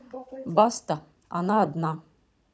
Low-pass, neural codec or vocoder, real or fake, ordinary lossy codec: none; codec, 16 kHz, 16 kbps, FunCodec, trained on Chinese and English, 50 frames a second; fake; none